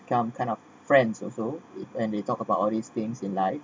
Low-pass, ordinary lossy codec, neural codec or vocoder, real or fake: none; none; none; real